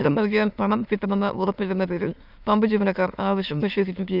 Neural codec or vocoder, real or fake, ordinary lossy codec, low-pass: autoencoder, 22.05 kHz, a latent of 192 numbers a frame, VITS, trained on many speakers; fake; none; 5.4 kHz